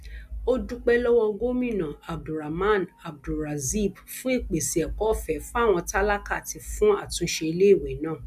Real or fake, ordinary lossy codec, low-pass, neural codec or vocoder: real; none; 14.4 kHz; none